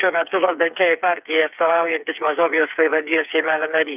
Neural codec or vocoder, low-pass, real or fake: codec, 16 kHz, 4 kbps, FreqCodec, smaller model; 3.6 kHz; fake